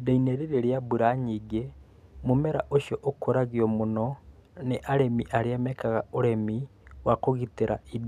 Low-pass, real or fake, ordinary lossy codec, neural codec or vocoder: 14.4 kHz; real; none; none